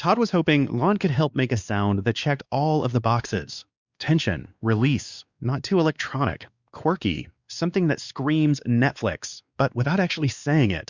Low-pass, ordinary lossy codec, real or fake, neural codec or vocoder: 7.2 kHz; Opus, 64 kbps; fake; codec, 16 kHz, 2 kbps, X-Codec, WavLM features, trained on Multilingual LibriSpeech